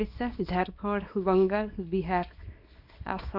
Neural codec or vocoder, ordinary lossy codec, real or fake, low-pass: codec, 24 kHz, 0.9 kbps, WavTokenizer, small release; none; fake; 5.4 kHz